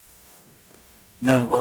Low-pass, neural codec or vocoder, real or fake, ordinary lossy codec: none; codec, 44.1 kHz, 0.9 kbps, DAC; fake; none